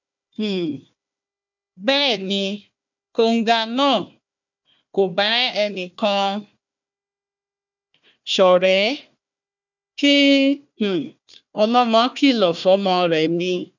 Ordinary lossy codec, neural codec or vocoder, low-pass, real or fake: none; codec, 16 kHz, 1 kbps, FunCodec, trained on Chinese and English, 50 frames a second; 7.2 kHz; fake